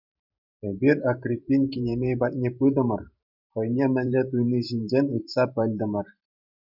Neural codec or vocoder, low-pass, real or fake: vocoder, 44.1 kHz, 128 mel bands every 512 samples, BigVGAN v2; 5.4 kHz; fake